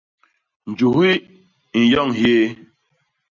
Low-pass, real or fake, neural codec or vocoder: 7.2 kHz; real; none